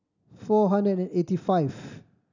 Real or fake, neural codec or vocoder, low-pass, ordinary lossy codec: real; none; 7.2 kHz; none